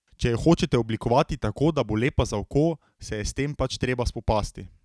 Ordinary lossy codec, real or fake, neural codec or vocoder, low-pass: none; real; none; none